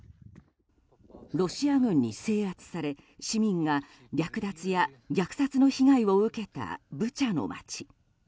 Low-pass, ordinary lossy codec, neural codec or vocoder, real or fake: none; none; none; real